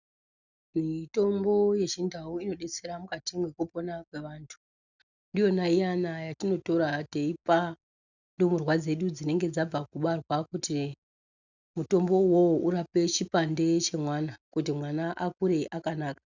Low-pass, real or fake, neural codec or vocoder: 7.2 kHz; real; none